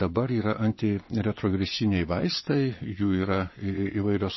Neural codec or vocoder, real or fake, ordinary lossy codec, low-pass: vocoder, 22.05 kHz, 80 mel bands, Vocos; fake; MP3, 24 kbps; 7.2 kHz